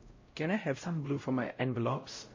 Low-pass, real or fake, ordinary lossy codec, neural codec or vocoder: 7.2 kHz; fake; MP3, 32 kbps; codec, 16 kHz, 0.5 kbps, X-Codec, WavLM features, trained on Multilingual LibriSpeech